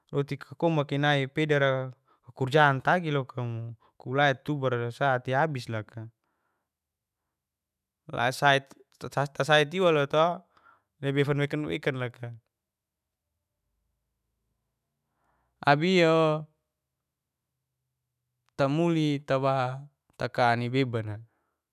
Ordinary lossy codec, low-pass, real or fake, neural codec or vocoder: none; 14.4 kHz; fake; autoencoder, 48 kHz, 128 numbers a frame, DAC-VAE, trained on Japanese speech